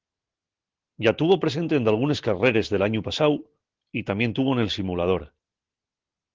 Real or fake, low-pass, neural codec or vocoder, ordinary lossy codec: real; 7.2 kHz; none; Opus, 16 kbps